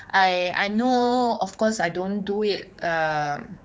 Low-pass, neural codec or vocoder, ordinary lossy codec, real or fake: none; codec, 16 kHz, 4 kbps, X-Codec, HuBERT features, trained on general audio; none; fake